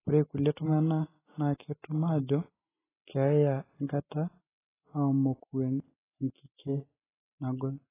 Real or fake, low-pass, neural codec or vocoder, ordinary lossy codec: real; 3.6 kHz; none; AAC, 16 kbps